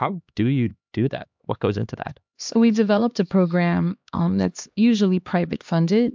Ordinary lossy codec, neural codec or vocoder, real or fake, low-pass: MP3, 64 kbps; codec, 16 kHz, 2 kbps, X-Codec, HuBERT features, trained on LibriSpeech; fake; 7.2 kHz